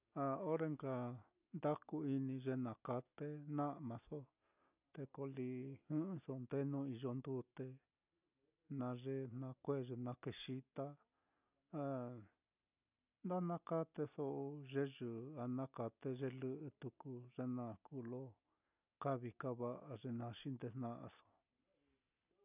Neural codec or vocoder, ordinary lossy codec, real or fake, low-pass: none; none; real; 3.6 kHz